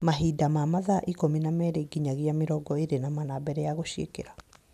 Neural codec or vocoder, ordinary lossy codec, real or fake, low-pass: none; none; real; 14.4 kHz